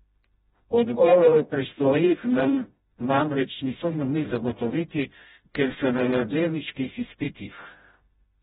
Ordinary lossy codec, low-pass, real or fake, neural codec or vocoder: AAC, 16 kbps; 7.2 kHz; fake; codec, 16 kHz, 0.5 kbps, FreqCodec, smaller model